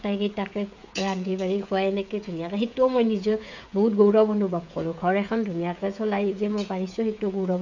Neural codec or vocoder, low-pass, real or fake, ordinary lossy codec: codec, 16 kHz, 16 kbps, FreqCodec, smaller model; 7.2 kHz; fake; none